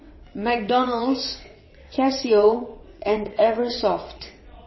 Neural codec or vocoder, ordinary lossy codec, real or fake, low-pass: vocoder, 22.05 kHz, 80 mel bands, WaveNeXt; MP3, 24 kbps; fake; 7.2 kHz